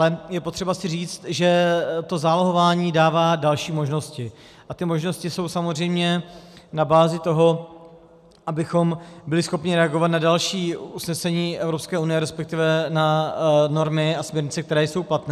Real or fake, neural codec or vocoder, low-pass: real; none; 14.4 kHz